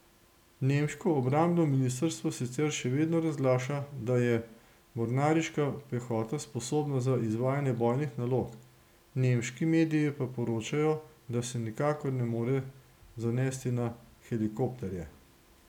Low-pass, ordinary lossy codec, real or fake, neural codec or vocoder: 19.8 kHz; none; real; none